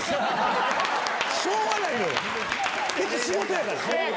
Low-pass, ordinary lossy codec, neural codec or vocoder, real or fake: none; none; none; real